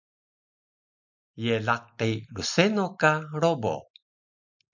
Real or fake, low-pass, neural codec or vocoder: real; 7.2 kHz; none